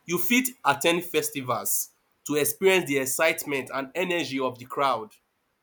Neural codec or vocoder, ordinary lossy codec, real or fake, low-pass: none; none; real; none